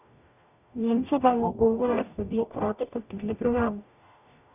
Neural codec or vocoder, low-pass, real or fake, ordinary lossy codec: codec, 44.1 kHz, 0.9 kbps, DAC; 3.6 kHz; fake; none